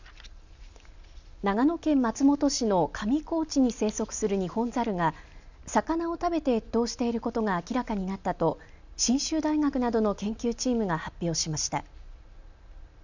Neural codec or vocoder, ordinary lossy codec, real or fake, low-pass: none; none; real; 7.2 kHz